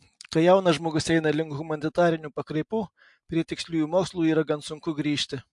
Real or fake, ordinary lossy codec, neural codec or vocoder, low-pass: real; MP3, 64 kbps; none; 10.8 kHz